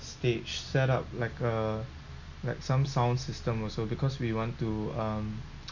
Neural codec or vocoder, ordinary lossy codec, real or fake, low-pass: none; none; real; 7.2 kHz